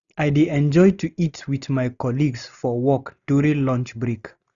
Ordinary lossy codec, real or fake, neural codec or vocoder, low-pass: none; real; none; 7.2 kHz